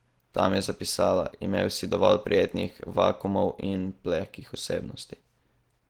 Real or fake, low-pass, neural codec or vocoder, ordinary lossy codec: real; 19.8 kHz; none; Opus, 16 kbps